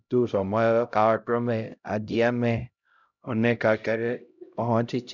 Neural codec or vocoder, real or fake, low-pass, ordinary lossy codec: codec, 16 kHz, 0.5 kbps, X-Codec, HuBERT features, trained on LibriSpeech; fake; 7.2 kHz; none